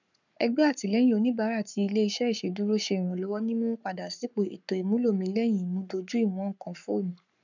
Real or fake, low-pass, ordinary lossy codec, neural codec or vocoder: fake; 7.2 kHz; none; codec, 44.1 kHz, 7.8 kbps, Pupu-Codec